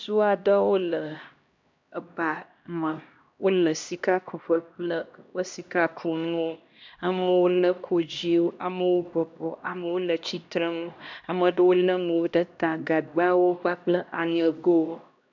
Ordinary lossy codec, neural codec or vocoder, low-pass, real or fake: MP3, 64 kbps; codec, 16 kHz, 1 kbps, X-Codec, HuBERT features, trained on LibriSpeech; 7.2 kHz; fake